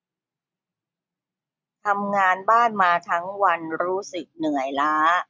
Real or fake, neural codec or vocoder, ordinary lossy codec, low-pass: real; none; none; none